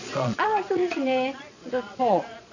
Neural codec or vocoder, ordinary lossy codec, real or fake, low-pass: vocoder, 22.05 kHz, 80 mel bands, Vocos; none; fake; 7.2 kHz